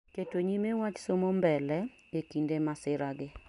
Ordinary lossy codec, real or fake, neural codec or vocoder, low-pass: none; real; none; 10.8 kHz